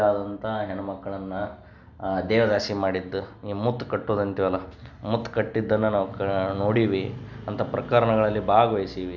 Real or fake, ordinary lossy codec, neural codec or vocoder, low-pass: real; none; none; 7.2 kHz